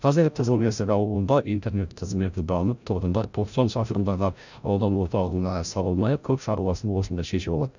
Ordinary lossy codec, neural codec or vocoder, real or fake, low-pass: none; codec, 16 kHz, 0.5 kbps, FreqCodec, larger model; fake; 7.2 kHz